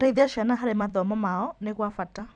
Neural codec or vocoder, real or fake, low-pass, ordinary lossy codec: vocoder, 44.1 kHz, 128 mel bands every 256 samples, BigVGAN v2; fake; 9.9 kHz; none